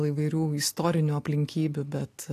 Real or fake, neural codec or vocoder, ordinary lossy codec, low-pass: real; none; AAC, 64 kbps; 14.4 kHz